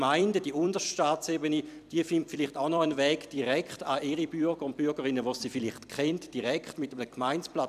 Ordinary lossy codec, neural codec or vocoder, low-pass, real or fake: none; vocoder, 44.1 kHz, 128 mel bands every 256 samples, BigVGAN v2; 14.4 kHz; fake